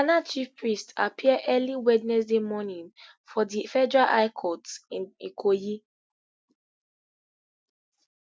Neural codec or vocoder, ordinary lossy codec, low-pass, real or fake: none; none; none; real